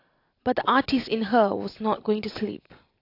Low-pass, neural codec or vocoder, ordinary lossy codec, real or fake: 5.4 kHz; none; AAC, 32 kbps; real